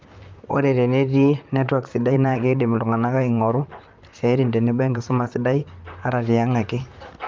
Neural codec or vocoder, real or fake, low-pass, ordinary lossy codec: vocoder, 44.1 kHz, 128 mel bands, Pupu-Vocoder; fake; 7.2 kHz; Opus, 24 kbps